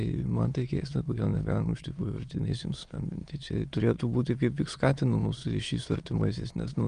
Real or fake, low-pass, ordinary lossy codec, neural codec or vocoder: fake; 9.9 kHz; Opus, 32 kbps; autoencoder, 22.05 kHz, a latent of 192 numbers a frame, VITS, trained on many speakers